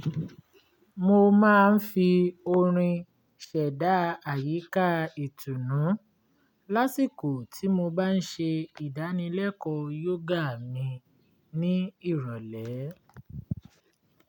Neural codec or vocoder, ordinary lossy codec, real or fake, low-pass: none; none; real; 19.8 kHz